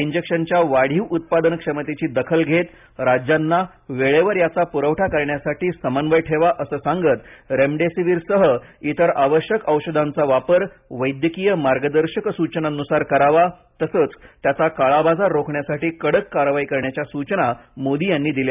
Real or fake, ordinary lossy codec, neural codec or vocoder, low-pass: real; none; none; 3.6 kHz